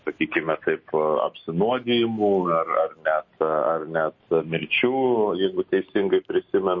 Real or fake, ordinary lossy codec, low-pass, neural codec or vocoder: real; MP3, 32 kbps; 7.2 kHz; none